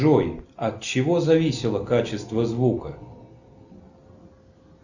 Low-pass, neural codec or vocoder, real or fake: 7.2 kHz; none; real